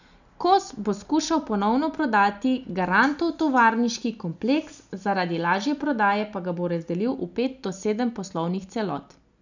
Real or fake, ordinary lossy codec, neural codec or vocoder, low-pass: real; none; none; 7.2 kHz